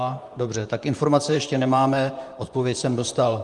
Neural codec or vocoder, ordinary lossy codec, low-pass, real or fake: vocoder, 44.1 kHz, 128 mel bands, Pupu-Vocoder; Opus, 32 kbps; 10.8 kHz; fake